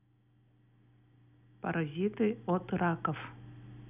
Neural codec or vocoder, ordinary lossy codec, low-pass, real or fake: none; none; 3.6 kHz; real